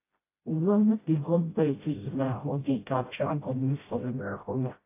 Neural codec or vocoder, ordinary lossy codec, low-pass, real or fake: codec, 16 kHz, 0.5 kbps, FreqCodec, smaller model; AAC, 24 kbps; 3.6 kHz; fake